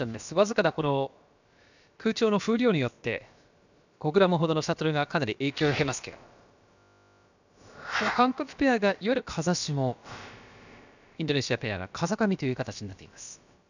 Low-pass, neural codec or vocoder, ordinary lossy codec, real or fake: 7.2 kHz; codec, 16 kHz, about 1 kbps, DyCAST, with the encoder's durations; none; fake